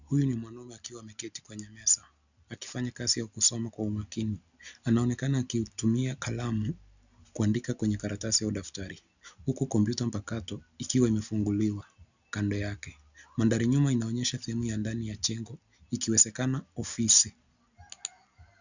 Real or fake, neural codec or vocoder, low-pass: real; none; 7.2 kHz